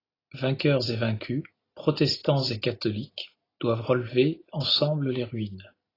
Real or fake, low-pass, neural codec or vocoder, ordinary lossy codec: real; 5.4 kHz; none; AAC, 24 kbps